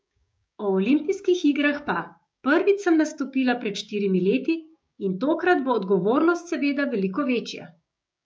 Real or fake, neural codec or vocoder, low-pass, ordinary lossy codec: fake; codec, 16 kHz, 6 kbps, DAC; none; none